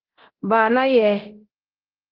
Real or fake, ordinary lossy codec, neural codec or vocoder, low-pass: fake; Opus, 16 kbps; codec, 24 kHz, 0.9 kbps, DualCodec; 5.4 kHz